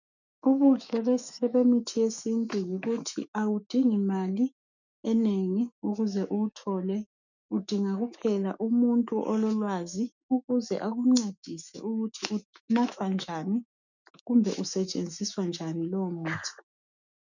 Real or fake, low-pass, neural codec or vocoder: fake; 7.2 kHz; autoencoder, 48 kHz, 128 numbers a frame, DAC-VAE, trained on Japanese speech